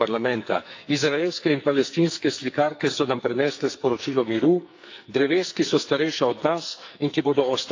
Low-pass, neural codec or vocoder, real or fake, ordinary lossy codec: 7.2 kHz; codec, 44.1 kHz, 2.6 kbps, SNAC; fake; AAC, 48 kbps